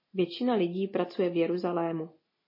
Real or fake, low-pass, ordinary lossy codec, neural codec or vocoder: real; 5.4 kHz; MP3, 24 kbps; none